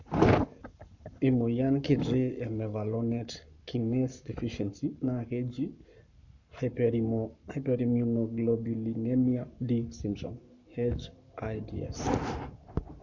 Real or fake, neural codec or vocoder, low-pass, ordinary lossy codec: fake; codec, 44.1 kHz, 7.8 kbps, Pupu-Codec; 7.2 kHz; none